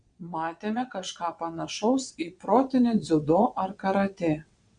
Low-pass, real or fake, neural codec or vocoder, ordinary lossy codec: 9.9 kHz; fake; vocoder, 22.05 kHz, 80 mel bands, WaveNeXt; Opus, 64 kbps